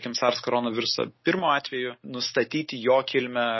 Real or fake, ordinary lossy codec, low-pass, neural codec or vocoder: real; MP3, 24 kbps; 7.2 kHz; none